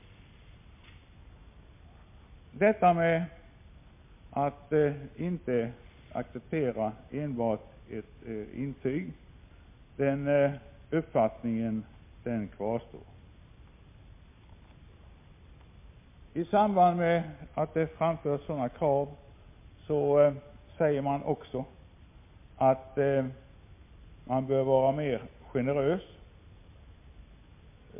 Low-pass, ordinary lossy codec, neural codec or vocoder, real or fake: 3.6 kHz; MP3, 24 kbps; none; real